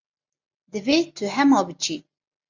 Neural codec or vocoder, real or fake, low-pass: none; real; 7.2 kHz